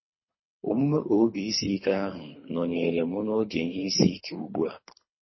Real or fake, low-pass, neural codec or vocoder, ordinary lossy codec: fake; 7.2 kHz; codec, 24 kHz, 3 kbps, HILCodec; MP3, 24 kbps